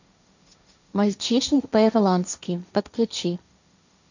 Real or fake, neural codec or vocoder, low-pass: fake; codec, 16 kHz, 1.1 kbps, Voila-Tokenizer; 7.2 kHz